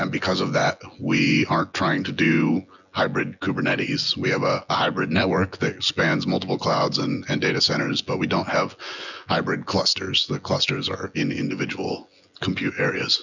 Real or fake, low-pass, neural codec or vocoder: fake; 7.2 kHz; vocoder, 24 kHz, 100 mel bands, Vocos